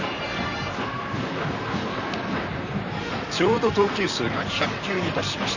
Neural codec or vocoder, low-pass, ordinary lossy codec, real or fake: vocoder, 44.1 kHz, 128 mel bands, Pupu-Vocoder; 7.2 kHz; none; fake